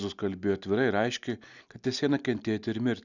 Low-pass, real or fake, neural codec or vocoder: 7.2 kHz; real; none